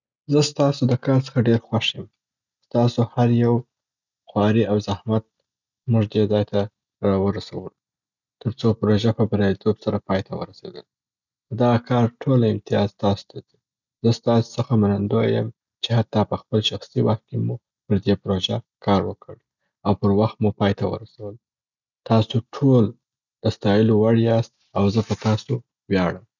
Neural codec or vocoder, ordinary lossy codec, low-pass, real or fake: none; none; 7.2 kHz; real